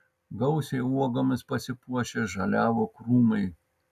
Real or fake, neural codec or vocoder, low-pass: real; none; 14.4 kHz